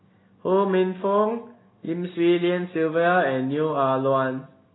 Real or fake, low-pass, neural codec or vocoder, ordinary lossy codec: real; 7.2 kHz; none; AAC, 16 kbps